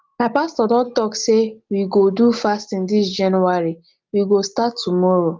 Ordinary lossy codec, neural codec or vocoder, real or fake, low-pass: Opus, 24 kbps; none; real; 7.2 kHz